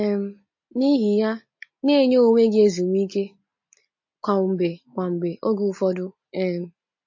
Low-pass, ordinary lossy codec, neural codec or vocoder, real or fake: 7.2 kHz; MP3, 32 kbps; none; real